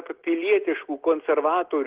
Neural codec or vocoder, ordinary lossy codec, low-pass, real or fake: none; Opus, 16 kbps; 3.6 kHz; real